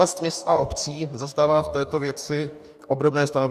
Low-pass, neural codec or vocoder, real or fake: 14.4 kHz; codec, 44.1 kHz, 2.6 kbps, DAC; fake